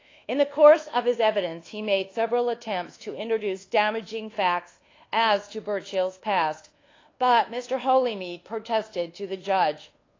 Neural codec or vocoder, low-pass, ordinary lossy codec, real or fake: codec, 24 kHz, 1.2 kbps, DualCodec; 7.2 kHz; AAC, 32 kbps; fake